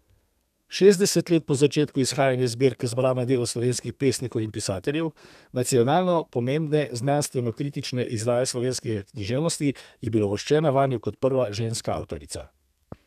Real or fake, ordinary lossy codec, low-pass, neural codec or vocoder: fake; none; 14.4 kHz; codec, 32 kHz, 1.9 kbps, SNAC